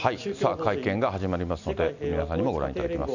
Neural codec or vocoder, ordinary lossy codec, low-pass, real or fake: none; none; 7.2 kHz; real